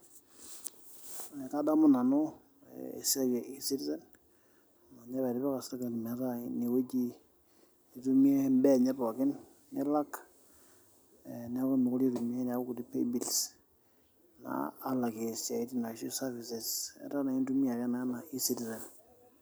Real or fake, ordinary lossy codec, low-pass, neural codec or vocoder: real; none; none; none